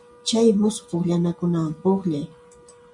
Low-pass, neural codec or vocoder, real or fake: 10.8 kHz; none; real